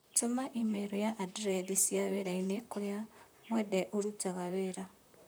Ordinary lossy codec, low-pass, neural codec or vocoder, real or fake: none; none; vocoder, 44.1 kHz, 128 mel bands, Pupu-Vocoder; fake